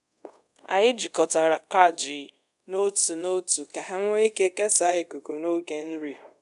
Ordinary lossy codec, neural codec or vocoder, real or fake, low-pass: none; codec, 24 kHz, 0.5 kbps, DualCodec; fake; 10.8 kHz